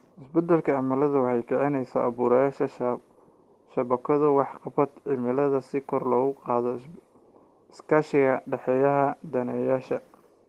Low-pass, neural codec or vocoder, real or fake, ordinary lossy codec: 14.4 kHz; none; real; Opus, 16 kbps